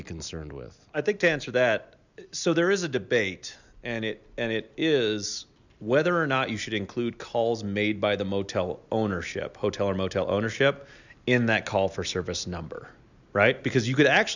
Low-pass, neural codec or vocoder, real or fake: 7.2 kHz; none; real